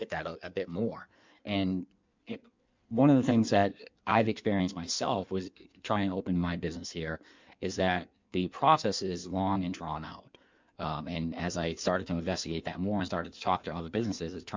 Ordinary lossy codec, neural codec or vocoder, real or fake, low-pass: MP3, 64 kbps; codec, 16 kHz in and 24 kHz out, 1.1 kbps, FireRedTTS-2 codec; fake; 7.2 kHz